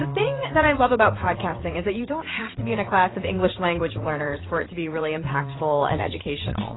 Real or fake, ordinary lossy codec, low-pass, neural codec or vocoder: fake; AAC, 16 kbps; 7.2 kHz; vocoder, 22.05 kHz, 80 mel bands, WaveNeXt